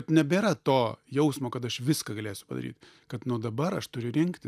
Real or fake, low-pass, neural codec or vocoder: real; 14.4 kHz; none